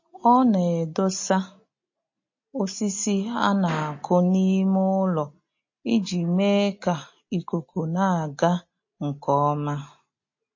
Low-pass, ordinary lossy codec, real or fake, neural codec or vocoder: 7.2 kHz; MP3, 32 kbps; real; none